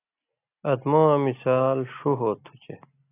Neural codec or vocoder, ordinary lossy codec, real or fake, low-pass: none; AAC, 24 kbps; real; 3.6 kHz